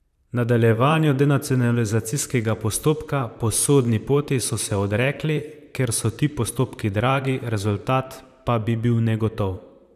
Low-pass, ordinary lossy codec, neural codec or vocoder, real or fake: 14.4 kHz; none; vocoder, 44.1 kHz, 128 mel bands, Pupu-Vocoder; fake